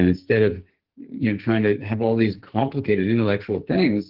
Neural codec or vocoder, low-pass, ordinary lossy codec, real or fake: codec, 44.1 kHz, 2.6 kbps, SNAC; 5.4 kHz; Opus, 16 kbps; fake